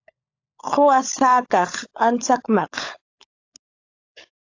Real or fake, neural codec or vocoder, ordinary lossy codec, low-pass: fake; codec, 16 kHz, 16 kbps, FunCodec, trained on LibriTTS, 50 frames a second; AAC, 48 kbps; 7.2 kHz